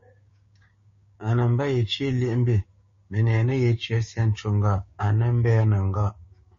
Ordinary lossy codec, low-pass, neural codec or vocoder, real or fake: MP3, 32 kbps; 7.2 kHz; codec, 16 kHz, 6 kbps, DAC; fake